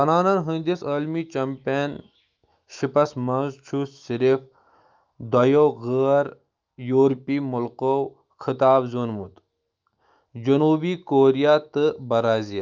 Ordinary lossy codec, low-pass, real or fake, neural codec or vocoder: Opus, 24 kbps; 7.2 kHz; fake; autoencoder, 48 kHz, 128 numbers a frame, DAC-VAE, trained on Japanese speech